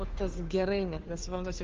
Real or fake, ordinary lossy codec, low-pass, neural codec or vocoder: fake; Opus, 24 kbps; 7.2 kHz; codec, 16 kHz, 2 kbps, FunCodec, trained on Chinese and English, 25 frames a second